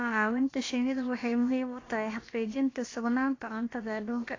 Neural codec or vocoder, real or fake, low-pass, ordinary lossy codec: codec, 16 kHz, about 1 kbps, DyCAST, with the encoder's durations; fake; 7.2 kHz; AAC, 32 kbps